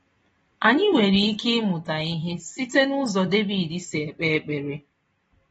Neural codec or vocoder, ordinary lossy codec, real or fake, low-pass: none; AAC, 24 kbps; real; 14.4 kHz